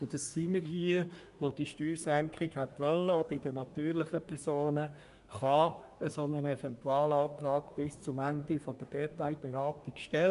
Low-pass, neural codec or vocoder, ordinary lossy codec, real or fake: 10.8 kHz; codec, 24 kHz, 1 kbps, SNAC; MP3, 96 kbps; fake